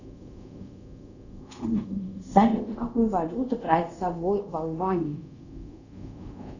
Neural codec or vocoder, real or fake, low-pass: codec, 24 kHz, 0.5 kbps, DualCodec; fake; 7.2 kHz